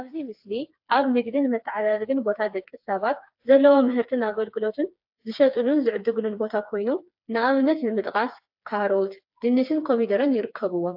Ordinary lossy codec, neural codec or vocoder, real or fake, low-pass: AAC, 48 kbps; codec, 16 kHz, 4 kbps, FreqCodec, smaller model; fake; 5.4 kHz